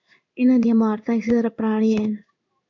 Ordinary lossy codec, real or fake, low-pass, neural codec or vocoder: MP3, 64 kbps; fake; 7.2 kHz; codec, 16 kHz in and 24 kHz out, 1 kbps, XY-Tokenizer